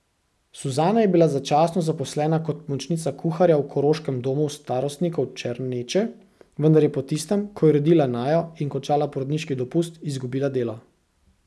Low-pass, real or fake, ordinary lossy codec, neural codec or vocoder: none; real; none; none